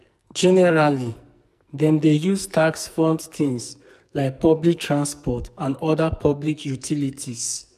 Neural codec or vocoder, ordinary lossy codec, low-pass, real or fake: codec, 44.1 kHz, 2.6 kbps, SNAC; none; 14.4 kHz; fake